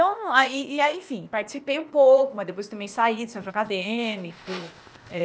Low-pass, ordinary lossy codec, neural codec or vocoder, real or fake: none; none; codec, 16 kHz, 0.8 kbps, ZipCodec; fake